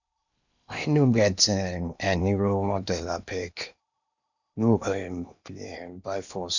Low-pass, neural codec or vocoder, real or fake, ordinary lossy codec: 7.2 kHz; codec, 16 kHz in and 24 kHz out, 0.8 kbps, FocalCodec, streaming, 65536 codes; fake; none